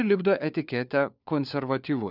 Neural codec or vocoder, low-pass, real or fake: codec, 16 kHz, 4 kbps, FunCodec, trained on LibriTTS, 50 frames a second; 5.4 kHz; fake